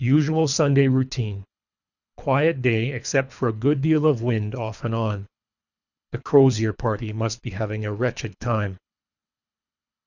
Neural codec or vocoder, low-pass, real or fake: codec, 24 kHz, 3 kbps, HILCodec; 7.2 kHz; fake